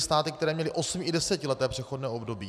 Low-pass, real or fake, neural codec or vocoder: 14.4 kHz; real; none